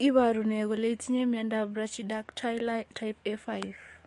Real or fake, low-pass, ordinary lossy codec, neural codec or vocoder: fake; 14.4 kHz; MP3, 48 kbps; codec, 44.1 kHz, 7.8 kbps, DAC